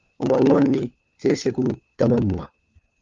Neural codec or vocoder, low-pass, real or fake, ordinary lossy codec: codec, 16 kHz, 4 kbps, FunCodec, trained on LibriTTS, 50 frames a second; 7.2 kHz; fake; Opus, 32 kbps